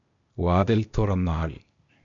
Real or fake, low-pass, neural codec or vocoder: fake; 7.2 kHz; codec, 16 kHz, 0.8 kbps, ZipCodec